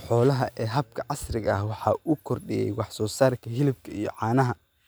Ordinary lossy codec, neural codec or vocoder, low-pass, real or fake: none; none; none; real